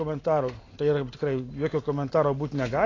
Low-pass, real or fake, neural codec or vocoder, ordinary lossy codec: 7.2 kHz; real; none; AAC, 32 kbps